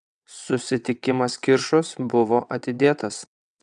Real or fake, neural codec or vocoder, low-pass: fake; vocoder, 22.05 kHz, 80 mel bands, WaveNeXt; 9.9 kHz